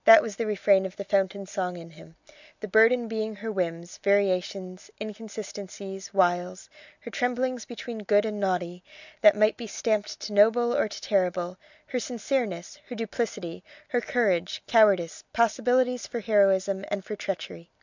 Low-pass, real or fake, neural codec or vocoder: 7.2 kHz; real; none